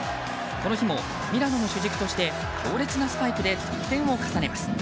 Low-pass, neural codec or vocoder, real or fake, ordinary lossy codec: none; none; real; none